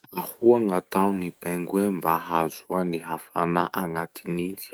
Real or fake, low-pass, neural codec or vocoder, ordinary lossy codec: fake; none; codec, 44.1 kHz, 7.8 kbps, DAC; none